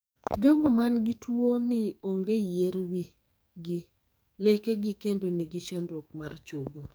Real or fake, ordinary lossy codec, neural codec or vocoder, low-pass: fake; none; codec, 44.1 kHz, 2.6 kbps, SNAC; none